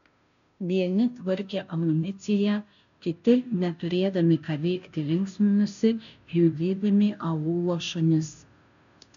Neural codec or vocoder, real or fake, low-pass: codec, 16 kHz, 0.5 kbps, FunCodec, trained on Chinese and English, 25 frames a second; fake; 7.2 kHz